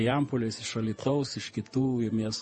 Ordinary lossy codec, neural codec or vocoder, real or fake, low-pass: MP3, 32 kbps; vocoder, 48 kHz, 128 mel bands, Vocos; fake; 10.8 kHz